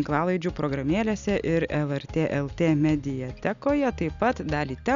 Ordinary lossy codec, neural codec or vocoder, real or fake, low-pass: Opus, 64 kbps; none; real; 7.2 kHz